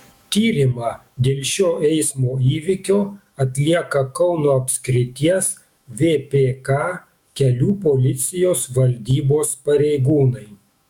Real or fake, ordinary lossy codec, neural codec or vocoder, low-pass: fake; MP3, 96 kbps; autoencoder, 48 kHz, 128 numbers a frame, DAC-VAE, trained on Japanese speech; 19.8 kHz